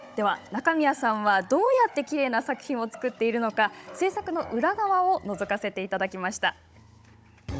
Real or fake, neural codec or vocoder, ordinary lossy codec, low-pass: fake; codec, 16 kHz, 16 kbps, FunCodec, trained on Chinese and English, 50 frames a second; none; none